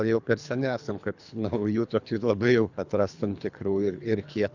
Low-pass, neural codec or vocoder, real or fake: 7.2 kHz; codec, 24 kHz, 3 kbps, HILCodec; fake